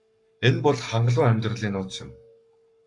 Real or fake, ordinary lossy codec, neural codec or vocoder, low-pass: fake; AAC, 64 kbps; codec, 44.1 kHz, 7.8 kbps, Pupu-Codec; 10.8 kHz